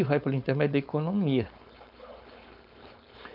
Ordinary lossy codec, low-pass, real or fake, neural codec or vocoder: none; 5.4 kHz; fake; codec, 16 kHz, 4.8 kbps, FACodec